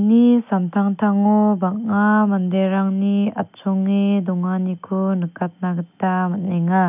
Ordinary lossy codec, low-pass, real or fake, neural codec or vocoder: none; 3.6 kHz; real; none